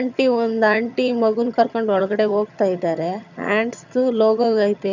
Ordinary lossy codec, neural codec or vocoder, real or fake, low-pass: none; vocoder, 22.05 kHz, 80 mel bands, HiFi-GAN; fake; 7.2 kHz